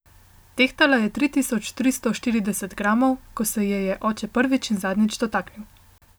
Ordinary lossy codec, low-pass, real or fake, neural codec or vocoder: none; none; real; none